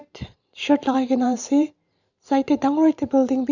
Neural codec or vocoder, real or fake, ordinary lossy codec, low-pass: vocoder, 44.1 kHz, 128 mel bands every 512 samples, BigVGAN v2; fake; none; 7.2 kHz